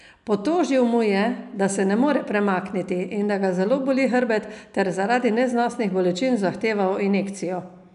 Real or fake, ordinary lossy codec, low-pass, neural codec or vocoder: real; none; 10.8 kHz; none